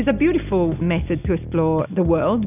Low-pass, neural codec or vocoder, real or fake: 3.6 kHz; none; real